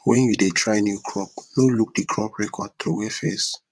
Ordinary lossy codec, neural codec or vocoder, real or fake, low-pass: none; vocoder, 22.05 kHz, 80 mel bands, WaveNeXt; fake; none